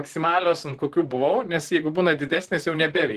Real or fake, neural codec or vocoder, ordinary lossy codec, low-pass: fake; vocoder, 44.1 kHz, 128 mel bands, Pupu-Vocoder; Opus, 16 kbps; 14.4 kHz